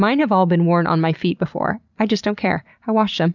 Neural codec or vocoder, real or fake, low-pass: none; real; 7.2 kHz